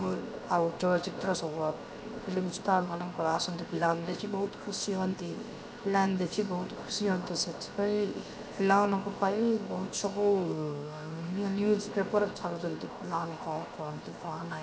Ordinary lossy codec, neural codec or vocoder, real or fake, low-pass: none; codec, 16 kHz, 0.7 kbps, FocalCodec; fake; none